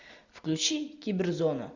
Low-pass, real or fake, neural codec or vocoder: 7.2 kHz; real; none